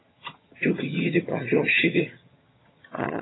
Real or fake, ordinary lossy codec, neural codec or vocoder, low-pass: fake; AAC, 16 kbps; vocoder, 22.05 kHz, 80 mel bands, HiFi-GAN; 7.2 kHz